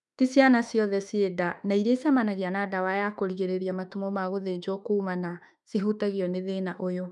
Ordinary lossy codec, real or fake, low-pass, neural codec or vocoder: none; fake; 10.8 kHz; autoencoder, 48 kHz, 32 numbers a frame, DAC-VAE, trained on Japanese speech